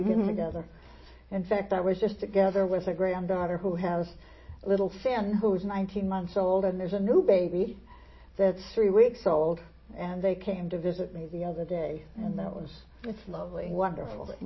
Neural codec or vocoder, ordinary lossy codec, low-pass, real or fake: none; MP3, 24 kbps; 7.2 kHz; real